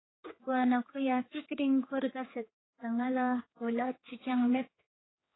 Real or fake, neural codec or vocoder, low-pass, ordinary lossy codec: fake; codec, 16 kHz, 4 kbps, X-Codec, HuBERT features, trained on general audio; 7.2 kHz; AAC, 16 kbps